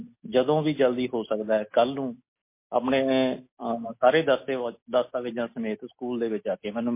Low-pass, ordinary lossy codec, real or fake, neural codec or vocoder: 3.6 kHz; MP3, 24 kbps; real; none